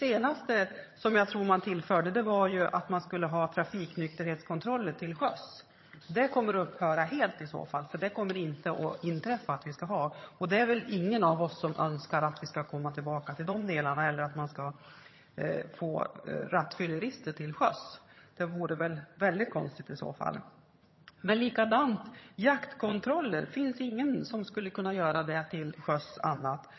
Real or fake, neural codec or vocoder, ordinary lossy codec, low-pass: fake; vocoder, 22.05 kHz, 80 mel bands, HiFi-GAN; MP3, 24 kbps; 7.2 kHz